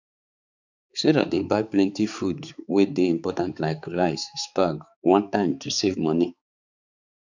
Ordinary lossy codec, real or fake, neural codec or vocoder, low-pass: none; fake; codec, 16 kHz, 4 kbps, X-Codec, HuBERT features, trained on balanced general audio; 7.2 kHz